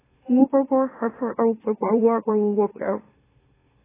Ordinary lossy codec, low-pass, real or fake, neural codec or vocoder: AAC, 16 kbps; 3.6 kHz; fake; autoencoder, 44.1 kHz, a latent of 192 numbers a frame, MeloTTS